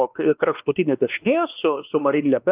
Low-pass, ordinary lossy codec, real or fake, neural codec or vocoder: 3.6 kHz; Opus, 24 kbps; fake; codec, 16 kHz, 2 kbps, X-Codec, WavLM features, trained on Multilingual LibriSpeech